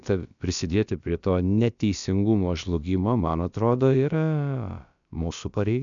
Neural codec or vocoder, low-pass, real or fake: codec, 16 kHz, about 1 kbps, DyCAST, with the encoder's durations; 7.2 kHz; fake